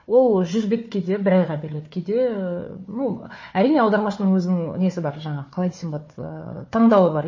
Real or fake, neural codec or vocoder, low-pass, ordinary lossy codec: fake; codec, 16 kHz, 4 kbps, FunCodec, trained on Chinese and English, 50 frames a second; 7.2 kHz; MP3, 32 kbps